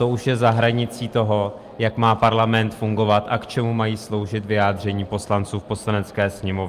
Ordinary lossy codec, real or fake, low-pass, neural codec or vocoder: Opus, 32 kbps; real; 14.4 kHz; none